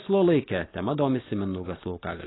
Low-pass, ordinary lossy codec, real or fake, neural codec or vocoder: 7.2 kHz; AAC, 16 kbps; real; none